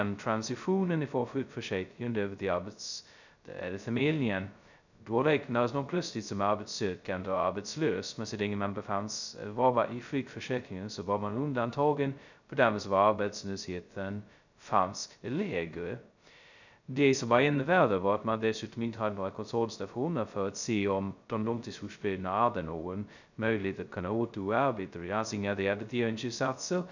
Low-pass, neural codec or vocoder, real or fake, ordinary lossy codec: 7.2 kHz; codec, 16 kHz, 0.2 kbps, FocalCodec; fake; none